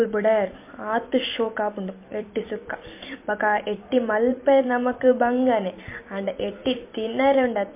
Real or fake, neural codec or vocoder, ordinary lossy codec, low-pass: fake; vocoder, 44.1 kHz, 128 mel bands every 256 samples, BigVGAN v2; MP3, 24 kbps; 3.6 kHz